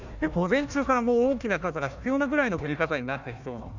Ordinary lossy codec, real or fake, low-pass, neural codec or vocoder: none; fake; 7.2 kHz; codec, 16 kHz, 1 kbps, FunCodec, trained on Chinese and English, 50 frames a second